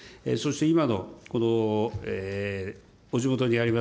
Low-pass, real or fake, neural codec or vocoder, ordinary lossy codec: none; real; none; none